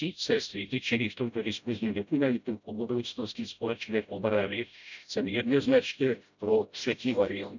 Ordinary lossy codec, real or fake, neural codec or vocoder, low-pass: none; fake; codec, 16 kHz, 0.5 kbps, FreqCodec, smaller model; 7.2 kHz